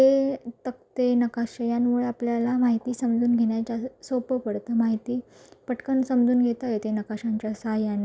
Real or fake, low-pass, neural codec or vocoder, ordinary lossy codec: real; none; none; none